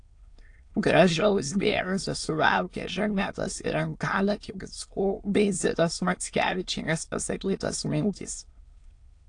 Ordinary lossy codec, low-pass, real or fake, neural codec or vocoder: AAC, 48 kbps; 9.9 kHz; fake; autoencoder, 22.05 kHz, a latent of 192 numbers a frame, VITS, trained on many speakers